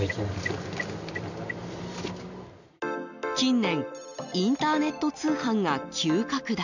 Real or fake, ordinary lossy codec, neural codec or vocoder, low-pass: real; none; none; 7.2 kHz